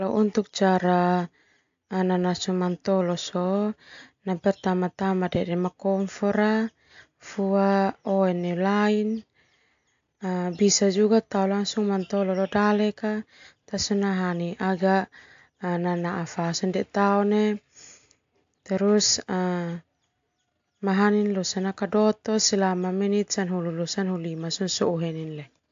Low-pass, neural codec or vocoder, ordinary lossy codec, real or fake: 7.2 kHz; none; AAC, 64 kbps; real